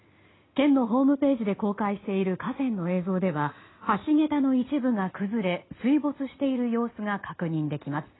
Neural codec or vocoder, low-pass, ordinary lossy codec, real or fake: none; 7.2 kHz; AAC, 16 kbps; real